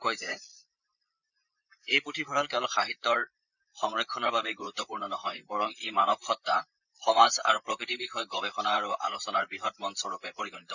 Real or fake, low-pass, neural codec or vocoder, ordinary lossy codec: fake; 7.2 kHz; vocoder, 44.1 kHz, 128 mel bands, Pupu-Vocoder; none